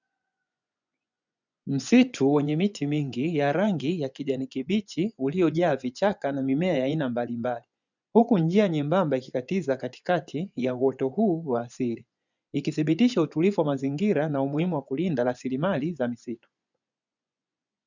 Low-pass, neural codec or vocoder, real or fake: 7.2 kHz; vocoder, 24 kHz, 100 mel bands, Vocos; fake